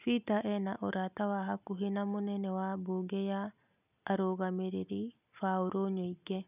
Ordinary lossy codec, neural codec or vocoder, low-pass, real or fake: none; none; 3.6 kHz; real